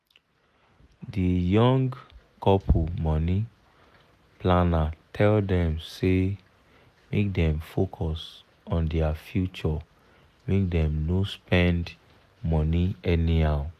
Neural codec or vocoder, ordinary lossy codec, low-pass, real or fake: none; none; 14.4 kHz; real